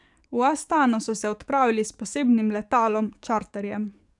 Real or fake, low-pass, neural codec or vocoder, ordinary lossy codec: fake; 10.8 kHz; codec, 44.1 kHz, 7.8 kbps, DAC; none